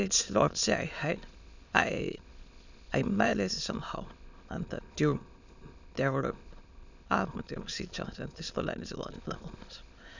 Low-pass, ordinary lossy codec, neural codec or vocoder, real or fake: 7.2 kHz; none; autoencoder, 22.05 kHz, a latent of 192 numbers a frame, VITS, trained on many speakers; fake